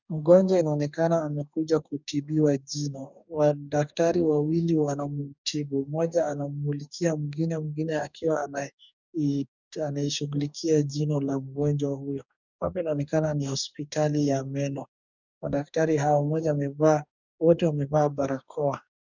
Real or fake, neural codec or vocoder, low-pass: fake; codec, 44.1 kHz, 2.6 kbps, DAC; 7.2 kHz